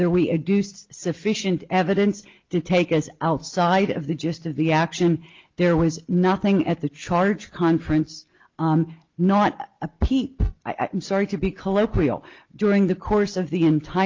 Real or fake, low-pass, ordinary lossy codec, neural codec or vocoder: real; 7.2 kHz; Opus, 24 kbps; none